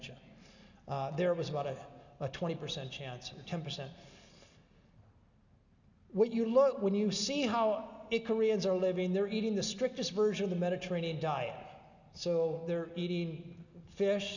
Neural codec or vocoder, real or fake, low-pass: none; real; 7.2 kHz